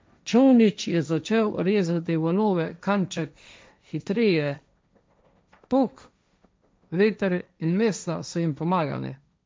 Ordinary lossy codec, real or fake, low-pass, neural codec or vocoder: none; fake; none; codec, 16 kHz, 1.1 kbps, Voila-Tokenizer